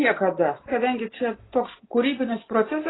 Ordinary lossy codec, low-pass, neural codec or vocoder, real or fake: AAC, 16 kbps; 7.2 kHz; none; real